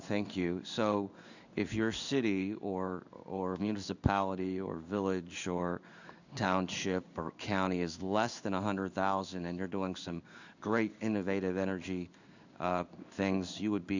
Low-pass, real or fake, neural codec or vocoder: 7.2 kHz; fake; codec, 16 kHz in and 24 kHz out, 1 kbps, XY-Tokenizer